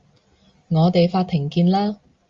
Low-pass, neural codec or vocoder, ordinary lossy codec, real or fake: 7.2 kHz; none; Opus, 32 kbps; real